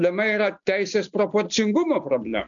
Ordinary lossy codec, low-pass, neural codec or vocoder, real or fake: MP3, 96 kbps; 7.2 kHz; none; real